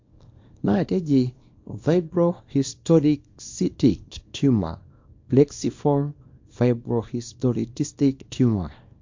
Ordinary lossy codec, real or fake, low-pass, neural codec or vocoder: MP3, 48 kbps; fake; 7.2 kHz; codec, 24 kHz, 0.9 kbps, WavTokenizer, small release